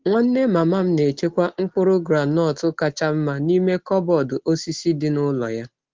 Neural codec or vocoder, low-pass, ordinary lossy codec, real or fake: none; 7.2 kHz; Opus, 16 kbps; real